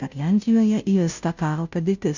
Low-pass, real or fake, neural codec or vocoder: 7.2 kHz; fake; codec, 16 kHz, 0.5 kbps, FunCodec, trained on Chinese and English, 25 frames a second